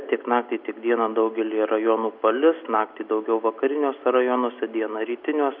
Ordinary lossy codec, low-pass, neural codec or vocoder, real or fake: AAC, 48 kbps; 5.4 kHz; none; real